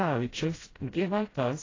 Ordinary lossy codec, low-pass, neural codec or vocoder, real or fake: AAC, 32 kbps; 7.2 kHz; codec, 16 kHz, 0.5 kbps, FreqCodec, smaller model; fake